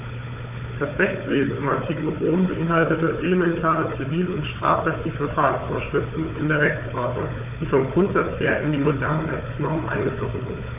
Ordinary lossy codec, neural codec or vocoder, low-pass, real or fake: none; codec, 16 kHz, 4 kbps, FunCodec, trained on Chinese and English, 50 frames a second; 3.6 kHz; fake